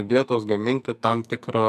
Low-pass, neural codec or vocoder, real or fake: 14.4 kHz; codec, 44.1 kHz, 2.6 kbps, SNAC; fake